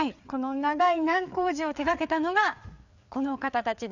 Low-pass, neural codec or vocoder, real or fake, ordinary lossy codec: 7.2 kHz; codec, 16 kHz, 4 kbps, FreqCodec, larger model; fake; none